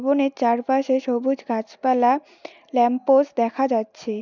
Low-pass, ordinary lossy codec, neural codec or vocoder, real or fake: 7.2 kHz; none; none; real